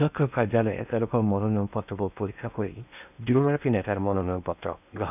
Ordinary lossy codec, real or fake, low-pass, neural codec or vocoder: none; fake; 3.6 kHz; codec, 16 kHz in and 24 kHz out, 0.8 kbps, FocalCodec, streaming, 65536 codes